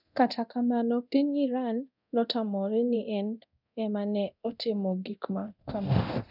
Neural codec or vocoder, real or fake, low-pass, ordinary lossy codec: codec, 24 kHz, 0.9 kbps, DualCodec; fake; 5.4 kHz; none